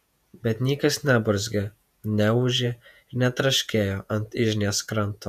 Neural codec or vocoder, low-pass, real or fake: vocoder, 48 kHz, 128 mel bands, Vocos; 14.4 kHz; fake